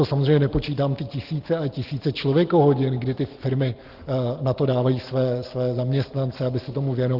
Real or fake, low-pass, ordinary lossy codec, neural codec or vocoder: real; 5.4 kHz; Opus, 16 kbps; none